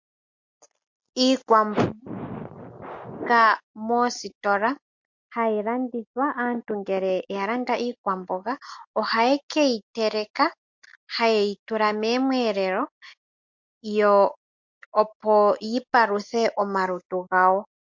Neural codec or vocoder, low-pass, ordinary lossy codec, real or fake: none; 7.2 kHz; MP3, 48 kbps; real